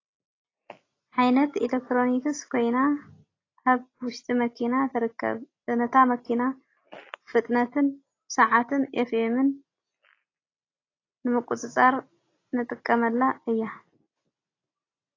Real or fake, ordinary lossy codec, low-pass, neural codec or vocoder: real; AAC, 32 kbps; 7.2 kHz; none